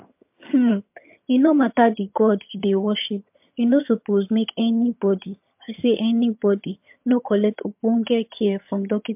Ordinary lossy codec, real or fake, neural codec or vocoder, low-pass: MP3, 32 kbps; fake; vocoder, 22.05 kHz, 80 mel bands, HiFi-GAN; 3.6 kHz